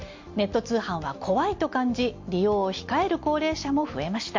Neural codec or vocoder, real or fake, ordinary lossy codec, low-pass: none; real; MP3, 48 kbps; 7.2 kHz